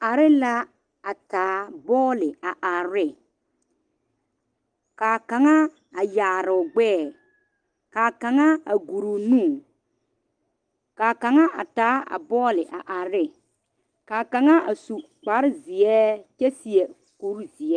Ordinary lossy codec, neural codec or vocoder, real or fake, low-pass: Opus, 24 kbps; none; real; 9.9 kHz